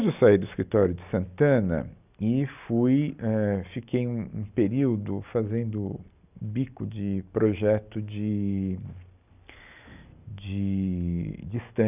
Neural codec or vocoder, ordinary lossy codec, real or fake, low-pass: none; none; real; 3.6 kHz